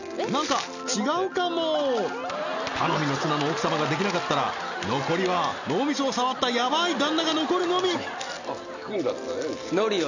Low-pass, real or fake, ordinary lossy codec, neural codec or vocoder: 7.2 kHz; real; none; none